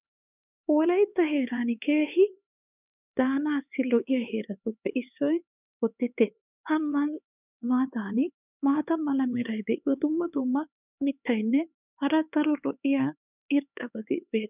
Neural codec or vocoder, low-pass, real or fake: codec, 16 kHz, 4 kbps, X-Codec, HuBERT features, trained on LibriSpeech; 3.6 kHz; fake